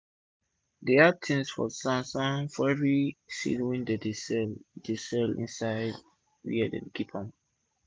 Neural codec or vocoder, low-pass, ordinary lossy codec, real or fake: none; none; none; real